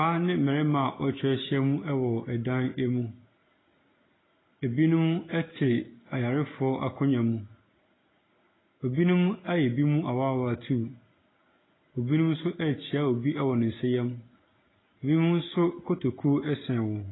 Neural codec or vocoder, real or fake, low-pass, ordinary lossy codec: none; real; 7.2 kHz; AAC, 16 kbps